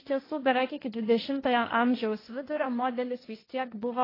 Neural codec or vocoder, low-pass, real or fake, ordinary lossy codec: codec, 16 kHz, 1.1 kbps, Voila-Tokenizer; 5.4 kHz; fake; AAC, 24 kbps